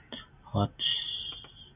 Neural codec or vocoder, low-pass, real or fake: none; 3.6 kHz; real